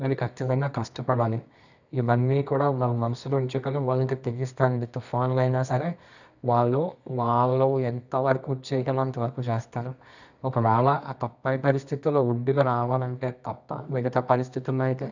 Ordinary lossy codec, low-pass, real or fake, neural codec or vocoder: none; 7.2 kHz; fake; codec, 24 kHz, 0.9 kbps, WavTokenizer, medium music audio release